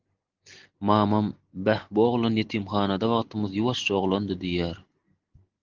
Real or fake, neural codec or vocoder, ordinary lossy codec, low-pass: real; none; Opus, 16 kbps; 7.2 kHz